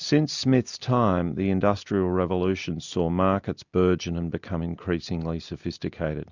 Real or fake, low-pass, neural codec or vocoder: real; 7.2 kHz; none